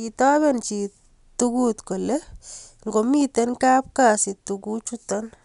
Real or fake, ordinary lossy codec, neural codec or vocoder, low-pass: real; none; none; 10.8 kHz